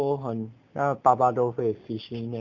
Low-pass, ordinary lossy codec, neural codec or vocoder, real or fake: 7.2 kHz; none; none; real